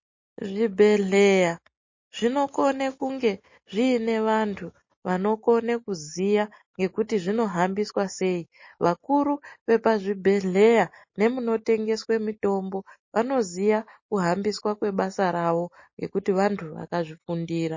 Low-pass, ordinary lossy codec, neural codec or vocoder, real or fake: 7.2 kHz; MP3, 32 kbps; none; real